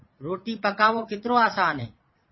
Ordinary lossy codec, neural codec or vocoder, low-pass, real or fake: MP3, 24 kbps; vocoder, 22.05 kHz, 80 mel bands, Vocos; 7.2 kHz; fake